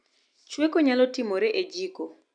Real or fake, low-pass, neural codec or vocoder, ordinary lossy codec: real; 9.9 kHz; none; none